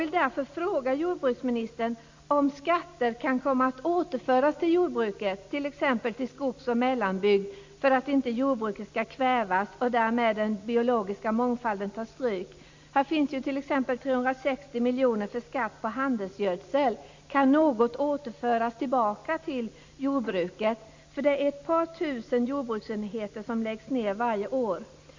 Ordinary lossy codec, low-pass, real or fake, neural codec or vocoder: MP3, 64 kbps; 7.2 kHz; real; none